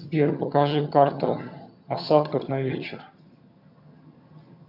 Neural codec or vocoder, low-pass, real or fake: vocoder, 22.05 kHz, 80 mel bands, HiFi-GAN; 5.4 kHz; fake